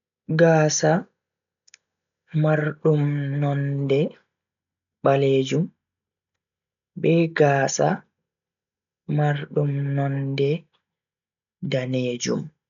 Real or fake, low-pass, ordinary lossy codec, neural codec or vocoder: real; 7.2 kHz; none; none